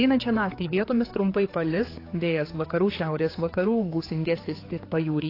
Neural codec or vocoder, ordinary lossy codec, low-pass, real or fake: codec, 16 kHz, 4 kbps, X-Codec, HuBERT features, trained on general audio; AAC, 24 kbps; 5.4 kHz; fake